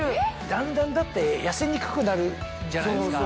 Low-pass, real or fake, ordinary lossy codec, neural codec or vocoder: none; real; none; none